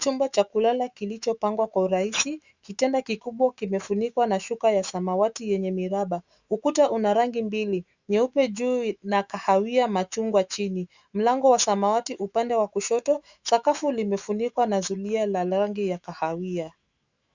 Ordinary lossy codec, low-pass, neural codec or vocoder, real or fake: Opus, 64 kbps; 7.2 kHz; autoencoder, 48 kHz, 128 numbers a frame, DAC-VAE, trained on Japanese speech; fake